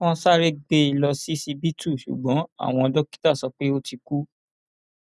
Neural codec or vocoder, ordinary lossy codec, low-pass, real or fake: none; none; none; real